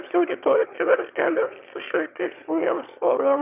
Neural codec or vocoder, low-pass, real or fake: autoencoder, 22.05 kHz, a latent of 192 numbers a frame, VITS, trained on one speaker; 3.6 kHz; fake